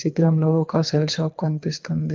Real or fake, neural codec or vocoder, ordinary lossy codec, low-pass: fake; codec, 24 kHz, 3 kbps, HILCodec; Opus, 24 kbps; 7.2 kHz